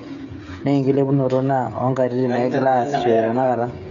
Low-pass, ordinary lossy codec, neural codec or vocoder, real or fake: 7.2 kHz; none; codec, 16 kHz, 16 kbps, FreqCodec, smaller model; fake